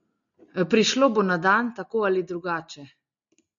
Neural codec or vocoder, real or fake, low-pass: none; real; 7.2 kHz